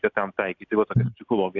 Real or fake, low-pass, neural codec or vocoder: real; 7.2 kHz; none